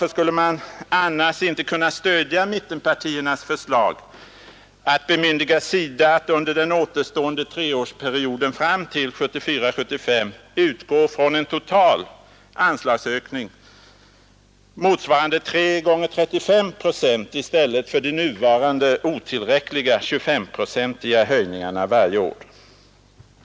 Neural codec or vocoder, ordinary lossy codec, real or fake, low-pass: none; none; real; none